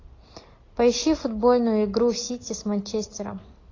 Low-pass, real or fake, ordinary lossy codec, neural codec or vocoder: 7.2 kHz; real; AAC, 32 kbps; none